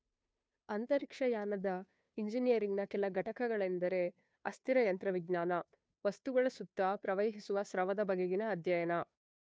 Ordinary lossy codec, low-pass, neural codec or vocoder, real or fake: none; none; codec, 16 kHz, 2 kbps, FunCodec, trained on Chinese and English, 25 frames a second; fake